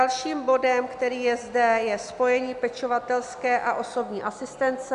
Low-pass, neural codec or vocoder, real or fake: 10.8 kHz; none; real